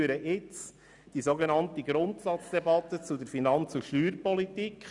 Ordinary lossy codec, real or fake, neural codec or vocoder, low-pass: none; real; none; 10.8 kHz